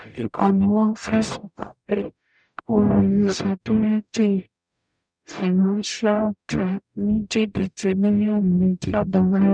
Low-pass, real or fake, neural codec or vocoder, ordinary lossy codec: 9.9 kHz; fake; codec, 44.1 kHz, 0.9 kbps, DAC; none